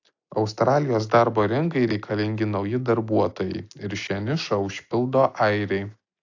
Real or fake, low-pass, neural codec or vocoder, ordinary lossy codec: real; 7.2 kHz; none; AAC, 48 kbps